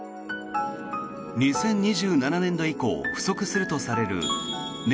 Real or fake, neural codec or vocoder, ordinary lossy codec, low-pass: real; none; none; none